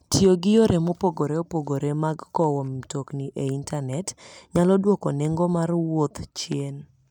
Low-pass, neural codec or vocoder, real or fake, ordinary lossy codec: 19.8 kHz; none; real; none